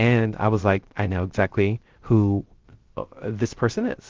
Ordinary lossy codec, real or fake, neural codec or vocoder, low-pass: Opus, 16 kbps; fake; codec, 16 kHz, 0.3 kbps, FocalCodec; 7.2 kHz